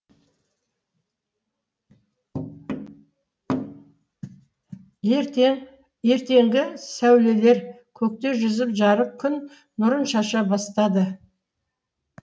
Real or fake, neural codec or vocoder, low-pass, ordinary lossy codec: real; none; none; none